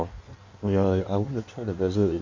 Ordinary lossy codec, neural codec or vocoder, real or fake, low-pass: MP3, 48 kbps; codec, 16 kHz in and 24 kHz out, 1.1 kbps, FireRedTTS-2 codec; fake; 7.2 kHz